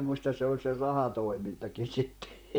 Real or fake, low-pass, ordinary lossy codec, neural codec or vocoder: fake; none; none; vocoder, 44.1 kHz, 128 mel bands, Pupu-Vocoder